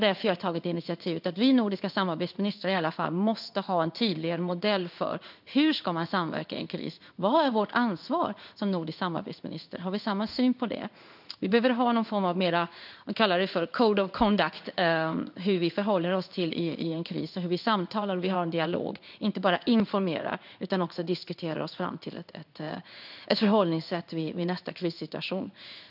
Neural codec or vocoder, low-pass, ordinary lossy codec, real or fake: codec, 16 kHz in and 24 kHz out, 1 kbps, XY-Tokenizer; 5.4 kHz; none; fake